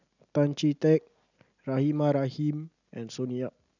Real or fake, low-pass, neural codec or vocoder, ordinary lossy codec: real; 7.2 kHz; none; none